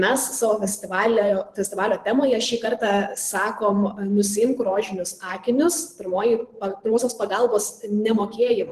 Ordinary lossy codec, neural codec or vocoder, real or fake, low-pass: Opus, 16 kbps; vocoder, 44.1 kHz, 128 mel bands every 512 samples, BigVGAN v2; fake; 14.4 kHz